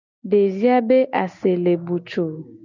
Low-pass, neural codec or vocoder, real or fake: 7.2 kHz; none; real